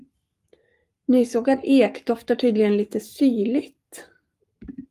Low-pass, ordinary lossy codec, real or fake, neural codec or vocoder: 14.4 kHz; Opus, 32 kbps; fake; codec, 44.1 kHz, 7.8 kbps, Pupu-Codec